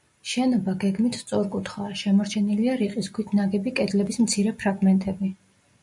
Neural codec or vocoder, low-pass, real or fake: none; 10.8 kHz; real